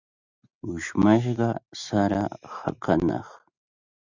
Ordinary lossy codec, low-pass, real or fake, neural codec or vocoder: Opus, 64 kbps; 7.2 kHz; real; none